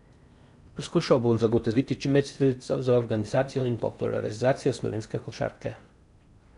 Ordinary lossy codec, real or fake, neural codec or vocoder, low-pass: none; fake; codec, 16 kHz in and 24 kHz out, 0.6 kbps, FocalCodec, streaming, 4096 codes; 10.8 kHz